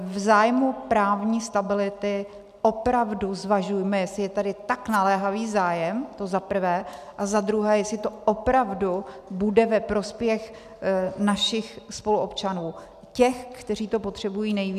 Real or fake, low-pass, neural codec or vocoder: real; 14.4 kHz; none